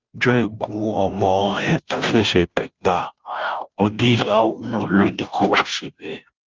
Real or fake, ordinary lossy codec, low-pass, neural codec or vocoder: fake; Opus, 32 kbps; 7.2 kHz; codec, 16 kHz, 0.5 kbps, FunCodec, trained on Chinese and English, 25 frames a second